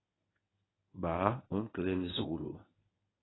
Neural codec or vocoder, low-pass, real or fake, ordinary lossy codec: codec, 24 kHz, 0.9 kbps, WavTokenizer, medium speech release version 1; 7.2 kHz; fake; AAC, 16 kbps